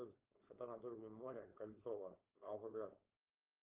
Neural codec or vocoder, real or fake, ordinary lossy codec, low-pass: codec, 16 kHz, 4.8 kbps, FACodec; fake; Opus, 32 kbps; 3.6 kHz